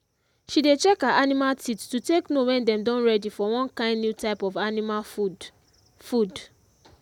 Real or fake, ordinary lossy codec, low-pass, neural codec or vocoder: real; none; 19.8 kHz; none